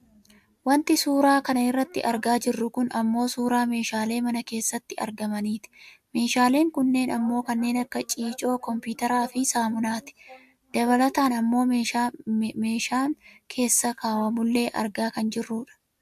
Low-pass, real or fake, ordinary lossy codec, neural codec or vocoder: 14.4 kHz; real; AAC, 96 kbps; none